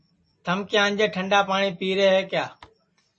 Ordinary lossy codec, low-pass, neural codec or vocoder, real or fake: MP3, 32 kbps; 9.9 kHz; none; real